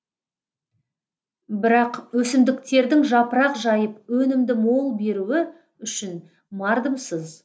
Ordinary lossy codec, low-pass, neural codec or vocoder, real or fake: none; none; none; real